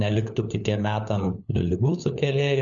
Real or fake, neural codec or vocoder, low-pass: fake; codec, 16 kHz, 4 kbps, FunCodec, trained on LibriTTS, 50 frames a second; 7.2 kHz